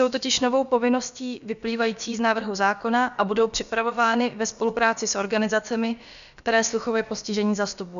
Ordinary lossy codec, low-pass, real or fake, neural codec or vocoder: MP3, 96 kbps; 7.2 kHz; fake; codec, 16 kHz, about 1 kbps, DyCAST, with the encoder's durations